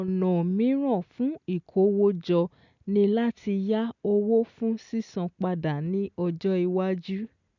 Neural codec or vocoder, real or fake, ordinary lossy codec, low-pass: none; real; none; 7.2 kHz